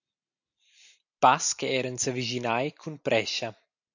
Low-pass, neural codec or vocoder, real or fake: 7.2 kHz; none; real